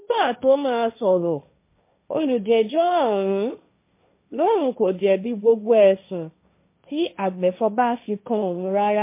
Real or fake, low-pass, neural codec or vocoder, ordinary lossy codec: fake; 3.6 kHz; codec, 16 kHz, 1.1 kbps, Voila-Tokenizer; MP3, 24 kbps